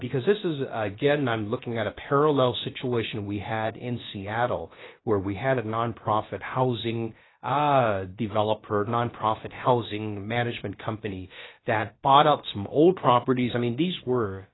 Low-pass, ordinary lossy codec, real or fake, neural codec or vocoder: 7.2 kHz; AAC, 16 kbps; fake; codec, 16 kHz, about 1 kbps, DyCAST, with the encoder's durations